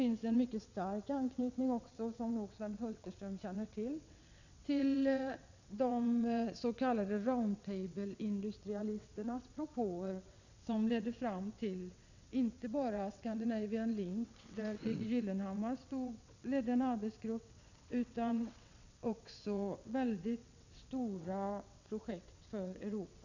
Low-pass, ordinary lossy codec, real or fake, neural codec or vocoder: 7.2 kHz; none; fake; vocoder, 22.05 kHz, 80 mel bands, WaveNeXt